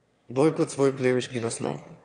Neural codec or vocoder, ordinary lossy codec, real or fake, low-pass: autoencoder, 22.05 kHz, a latent of 192 numbers a frame, VITS, trained on one speaker; none; fake; 9.9 kHz